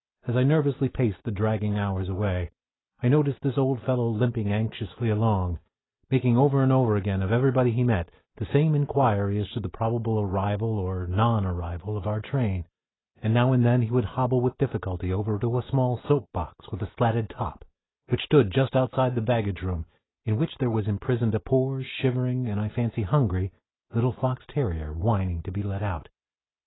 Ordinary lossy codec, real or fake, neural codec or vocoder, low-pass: AAC, 16 kbps; real; none; 7.2 kHz